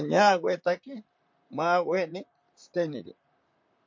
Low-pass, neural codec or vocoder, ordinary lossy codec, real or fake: 7.2 kHz; vocoder, 44.1 kHz, 128 mel bands, Pupu-Vocoder; MP3, 48 kbps; fake